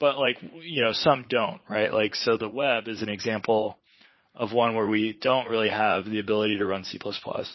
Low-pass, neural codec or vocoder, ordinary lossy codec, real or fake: 7.2 kHz; vocoder, 44.1 kHz, 80 mel bands, Vocos; MP3, 24 kbps; fake